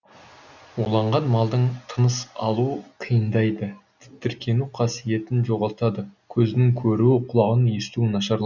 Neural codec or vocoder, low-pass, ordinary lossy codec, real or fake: none; 7.2 kHz; none; real